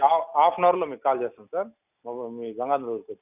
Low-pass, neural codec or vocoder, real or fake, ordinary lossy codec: 3.6 kHz; none; real; none